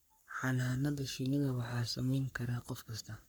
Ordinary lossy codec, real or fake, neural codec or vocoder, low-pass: none; fake; codec, 44.1 kHz, 3.4 kbps, Pupu-Codec; none